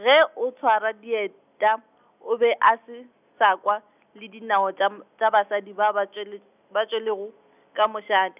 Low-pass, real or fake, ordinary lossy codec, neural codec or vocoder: 3.6 kHz; real; none; none